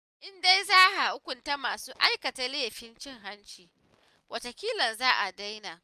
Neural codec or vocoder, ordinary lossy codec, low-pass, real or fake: none; none; 14.4 kHz; real